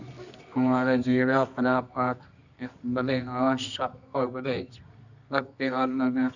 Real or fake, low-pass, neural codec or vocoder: fake; 7.2 kHz; codec, 24 kHz, 0.9 kbps, WavTokenizer, medium music audio release